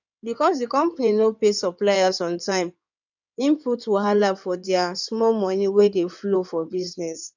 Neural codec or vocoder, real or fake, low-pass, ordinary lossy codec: codec, 16 kHz in and 24 kHz out, 2.2 kbps, FireRedTTS-2 codec; fake; 7.2 kHz; none